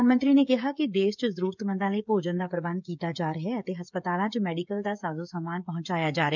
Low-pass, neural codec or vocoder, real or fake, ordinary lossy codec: 7.2 kHz; codec, 16 kHz, 16 kbps, FreqCodec, smaller model; fake; none